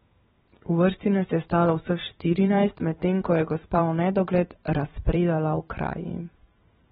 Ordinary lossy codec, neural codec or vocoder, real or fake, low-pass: AAC, 16 kbps; vocoder, 44.1 kHz, 128 mel bands every 512 samples, BigVGAN v2; fake; 19.8 kHz